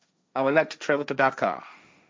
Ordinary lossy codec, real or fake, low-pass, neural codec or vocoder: none; fake; none; codec, 16 kHz, 1.1 kbps, Voila-Tokenizer